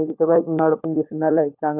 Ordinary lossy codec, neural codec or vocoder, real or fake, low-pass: none; codec, 16 kHz, 16 kbps, FunCodec, trained on LibriTTS, 50 frames a second; fake; 3.6 kHz